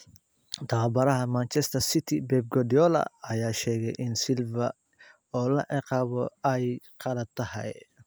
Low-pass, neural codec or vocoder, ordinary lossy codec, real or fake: none; none; none; real